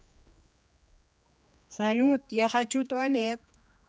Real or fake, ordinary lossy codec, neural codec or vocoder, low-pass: fake; none; codec, 16 kHz, 2 kbps, X-Codec, HuBERT features, trained on general audio; none